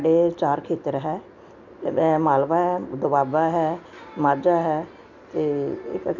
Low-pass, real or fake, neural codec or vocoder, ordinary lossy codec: 7.2 kHz; real; none; none